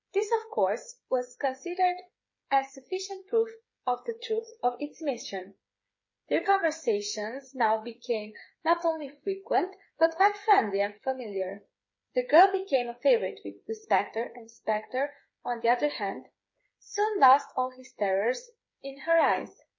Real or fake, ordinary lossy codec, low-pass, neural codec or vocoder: fake; MP3, 32 kbps; 7.2 kHz; codec, 16 kHz, 8 kbps, FreqCodec, smaller model